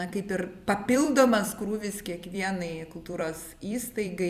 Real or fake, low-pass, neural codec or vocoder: fake; 14.4 kHz; vocoder, 44.1 kHz, 128 mel bands every 256 samples, BigVGAN v2